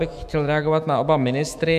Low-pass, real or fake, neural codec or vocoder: 14.4 kHz; fake; autoencoder, 48 kHz, 128 numbers a frame, DAC-VAE, trained on Japanese speech